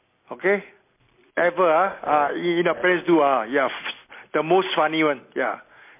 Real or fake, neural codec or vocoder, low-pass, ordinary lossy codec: real; none; 3.6 kHz; MP3, 24 kbps